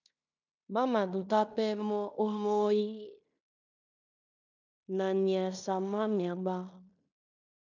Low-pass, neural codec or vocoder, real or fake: 7.2 kHz; codec, 16 kHz in and 24 kHz out, 0.9 kbps, LongCat-Audio-Codec, fine tuned four codebook decoder; fake